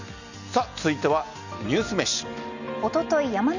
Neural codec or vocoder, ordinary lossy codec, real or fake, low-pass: none; none; real; 7.2 kHz